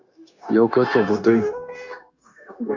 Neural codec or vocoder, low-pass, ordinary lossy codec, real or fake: codec, 16 kHz, 0.9 kbps, LongCat-Audio-Codec; 7.2 kHz; Opus, 64 kbps; fake